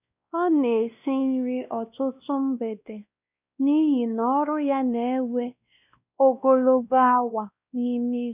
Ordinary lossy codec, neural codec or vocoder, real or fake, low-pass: none; codec, 16 kHz, 1 kbps, X-Codec, WavLM features, trained on Multilingual LibriSpeech; fake; 3.6 kHz